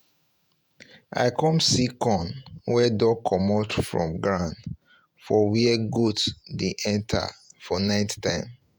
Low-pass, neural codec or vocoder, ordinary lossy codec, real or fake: none; vocoder, 48 kHz, 128 mel bands, Vocos; none; fake